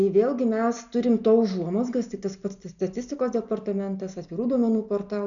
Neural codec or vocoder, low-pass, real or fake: none; 7.2 kHz; real